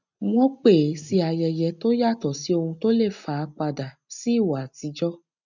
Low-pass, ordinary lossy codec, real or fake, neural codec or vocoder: 7.2 kHz; none; real; none